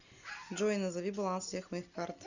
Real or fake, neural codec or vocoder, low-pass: real; none; 7.2 kHz